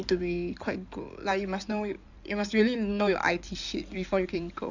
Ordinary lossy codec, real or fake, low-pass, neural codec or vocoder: none; fake; 7.2 kHz; codec, 16 kHz in and 24 kHz out, 2.2 kbps, FireRedTTS-2 codec